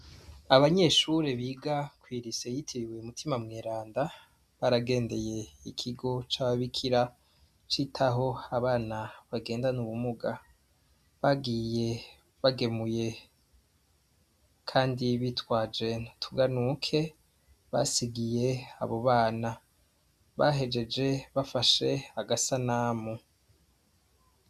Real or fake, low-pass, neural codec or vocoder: real; 14.4 kHz; none